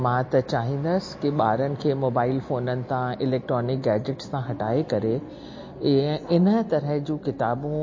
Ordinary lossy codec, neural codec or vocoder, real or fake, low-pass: MP3, 32 kbps; none; real; 7.2 kHz